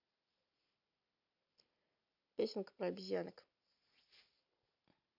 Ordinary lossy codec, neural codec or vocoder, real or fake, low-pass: none; none; real; 5.4 kHz